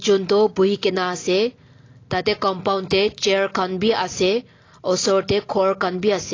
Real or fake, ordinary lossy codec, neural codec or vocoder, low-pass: real; AAC, 32 kbps; none; 7.2 kHz